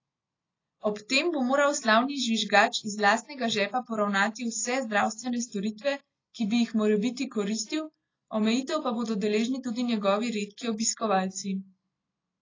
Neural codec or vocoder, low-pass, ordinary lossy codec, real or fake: none; 7.2 kHz; AAC, 32 kbps; real